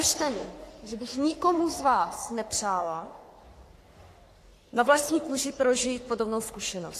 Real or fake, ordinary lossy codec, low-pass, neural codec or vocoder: fake; AAC, 64 kbps; 14.4 kHz; codec, 44.1 kHz, 3.4 kbps, Pupu-Codec